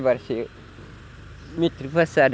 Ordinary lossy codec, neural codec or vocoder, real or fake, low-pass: none; none; real; none